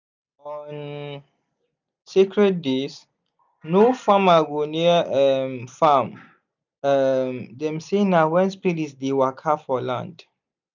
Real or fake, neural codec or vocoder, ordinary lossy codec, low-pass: real; none; none; 7.2 kHz